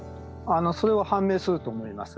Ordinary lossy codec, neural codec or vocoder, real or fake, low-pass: none; none; real; none